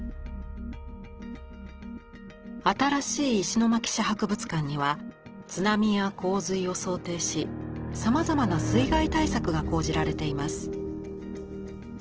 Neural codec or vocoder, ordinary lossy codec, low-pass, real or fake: none; Opus, 16 kbps; 7.2 kHz; real